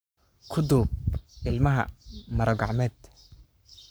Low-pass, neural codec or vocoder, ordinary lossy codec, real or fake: none; codec, 44.1 kHz, 7.8 kbps, Pupu-Codec; none; fake